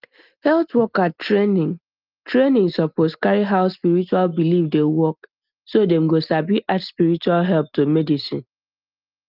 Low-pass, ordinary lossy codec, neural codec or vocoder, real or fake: 5.4 kHz; Opus, 32 kbps; none; real